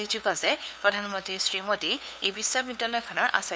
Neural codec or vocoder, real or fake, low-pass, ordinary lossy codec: codec, 16 kHz, 2 kbps, FunCodec, trained on LibriTTS, 25 frames a second; fake; none; none